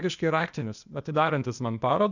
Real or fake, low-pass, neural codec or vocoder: fake; 7.2 kHz; codec, 16 kHz, 0.8 kbps, ZipCodec